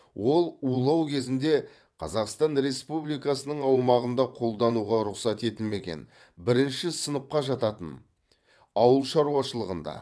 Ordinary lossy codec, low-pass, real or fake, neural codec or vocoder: none; none; fake; vocoder, 22.05 kHz, 80 mel bands, WaveNeXt